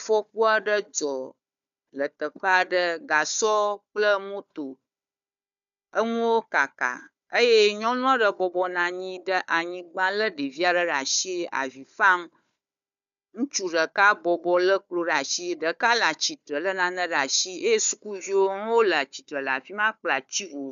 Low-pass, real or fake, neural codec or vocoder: 7.2 kHz; fake; codec, 16 kHz, 4 kbps, FunCodec, trained on Chinese and English, 50 frames a second